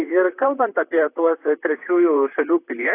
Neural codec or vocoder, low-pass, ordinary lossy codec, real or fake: vocoder, 44.1 kHz, 128 mel bands, Pupu-Vocoder; 3.6 kHz; AAC, 24 kbps; fake